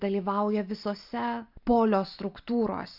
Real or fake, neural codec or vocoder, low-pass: real; none; 5.4 kHz